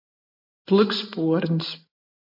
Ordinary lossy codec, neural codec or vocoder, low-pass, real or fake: MP3, 32 kbps; none; 5.4 kHz; real